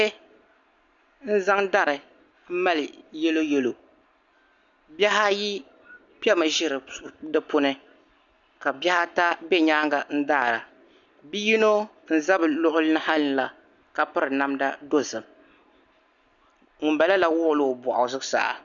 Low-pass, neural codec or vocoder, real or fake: 7.2 kHz; none; real